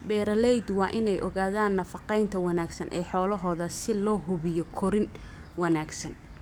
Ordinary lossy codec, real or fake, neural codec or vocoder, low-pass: none; fake; codec, 44.1 kHz, 7.8 kbps, DAC; none